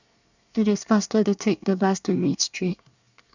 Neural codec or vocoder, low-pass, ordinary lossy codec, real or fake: codec, 24 kHz, 1 kbps, SNAC; 7.2 kHz; none; fake